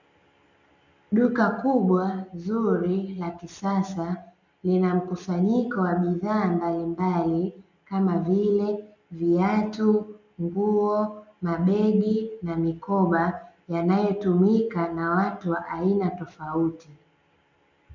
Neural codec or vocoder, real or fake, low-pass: none; real; 7.2 kHz